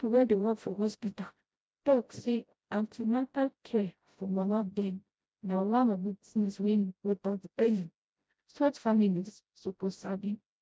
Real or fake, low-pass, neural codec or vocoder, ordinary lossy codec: fake; none; codec, 16 kHz, 0.5 kbps, FreqCodec, smaller model; none